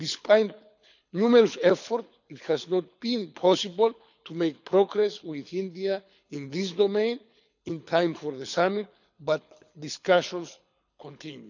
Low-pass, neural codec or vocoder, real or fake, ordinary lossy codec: 7.2 kHz; codec, 24 kHz, 6 kbps, HILCodec; fake; none